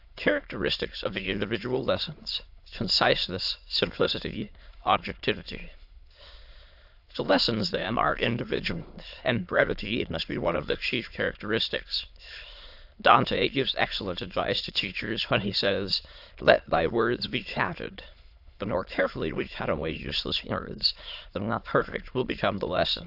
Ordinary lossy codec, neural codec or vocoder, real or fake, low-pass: AAC, 48 kbps; autoencoder, 22.05 kHz, a latent of 192 numbers a frame, VITS, trained on many speakers; fake; 5.4 kHz